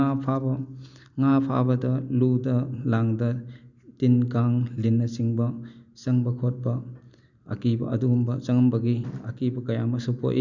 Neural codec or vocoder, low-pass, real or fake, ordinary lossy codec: none; 7.2 kHz; real; none